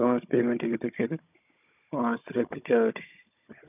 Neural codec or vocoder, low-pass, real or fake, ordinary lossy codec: codec, 16 kHz, 16 kbps, FunCodec, trained on Chinese and English, 50 frames a second; 3.6 kHz; fake; none